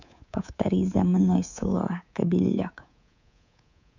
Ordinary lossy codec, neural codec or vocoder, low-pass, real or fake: none; codec, 24 kHz, 3.1 kbps, DualCodec; 7.2 kHz; fake